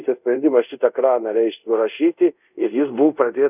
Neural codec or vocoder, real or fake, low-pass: codec, 24 kHz, 0.5 kbps, DualCodec; fake; 3.6 kHz